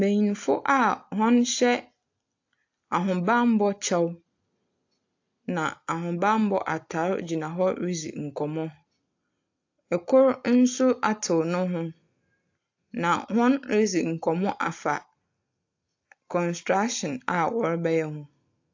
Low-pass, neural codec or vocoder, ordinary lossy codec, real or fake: 7.2 kHz; none; AAC, 48 kbps; real